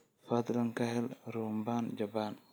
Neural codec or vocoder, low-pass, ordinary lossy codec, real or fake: none; none; none; real